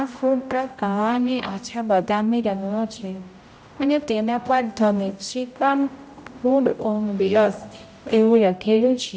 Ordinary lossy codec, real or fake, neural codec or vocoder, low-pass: none; fake; codec, 16 kHz, 0.5 kbps, X-Codec, HuBERT features, trained on general audio; none